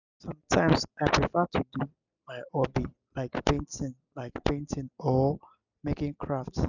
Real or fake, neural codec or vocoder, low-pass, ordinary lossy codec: real; none; 7.2 kHz; none